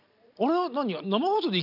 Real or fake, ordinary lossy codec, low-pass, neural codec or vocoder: real; none; 5.4 kHz; none